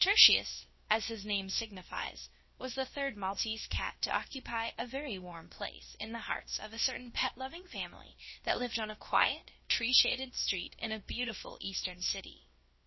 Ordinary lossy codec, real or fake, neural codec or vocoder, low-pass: MP3, 24 kbps; fake; codec, 16 kHz, about 1 kbps, DyCAST, with the encoder's durations; 7.2 kHz